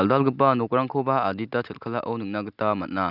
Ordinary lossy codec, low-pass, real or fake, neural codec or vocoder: none; 5.4 kHz; real; none